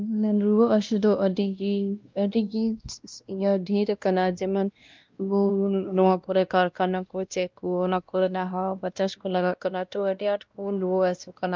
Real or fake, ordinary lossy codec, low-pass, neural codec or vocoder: fake; Opus, 24 kbps; 7.2 kHz; codec, 16 kHz, 1 kbps, X-Codec, WavLM features, trained on Multilingual LibriSpeech